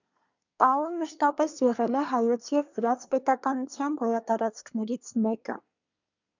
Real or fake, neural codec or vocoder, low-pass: fake; codec, 24 kHz, 1 kbps, SNAC; 7.2 kHz